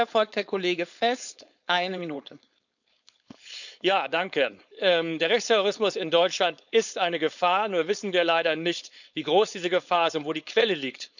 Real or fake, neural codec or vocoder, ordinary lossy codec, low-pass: fake; codec, 16 kHz, 4.8 kbps, FACodec; none; 7.2 kHz